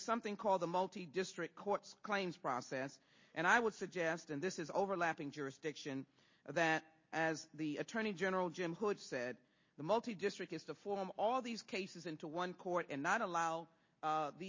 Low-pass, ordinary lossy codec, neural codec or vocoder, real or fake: 7.2 kHz; MP3, 32 kbps; none; real